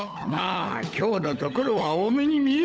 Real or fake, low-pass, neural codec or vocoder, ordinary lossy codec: fake; none; codec, 16 kHz, 16 kbps, FunCodec, trained on LibriTTS, 50 frames a second; none